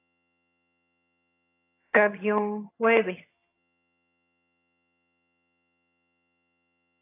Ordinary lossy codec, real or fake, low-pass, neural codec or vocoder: AAC, 24 kbps; fake; 3.6 kHz; vocoder, 22.05 kHz, 80 mel bands, HiFi-GAN